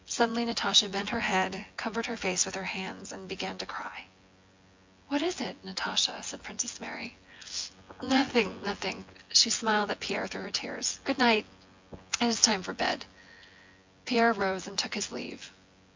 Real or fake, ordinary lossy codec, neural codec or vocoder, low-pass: fake; MP3, 64 kbps; vocoder, 24 kHz, 100 mel bands, Vocos; 7.2 kHz